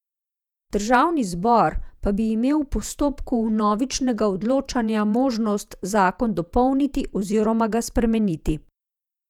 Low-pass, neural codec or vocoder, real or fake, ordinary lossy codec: 19.8 kHz; vocoder, 48 kHz, 128 mel bands, Vocos; fake; none